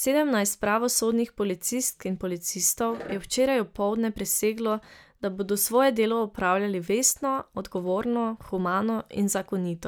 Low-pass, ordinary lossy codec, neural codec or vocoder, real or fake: none; none; none; real